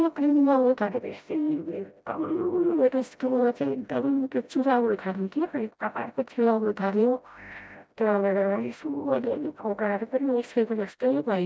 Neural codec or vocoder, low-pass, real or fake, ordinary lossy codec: codec, 16 kHz, 0.5 kbps, FreqCodec, smaller model; none; fake; none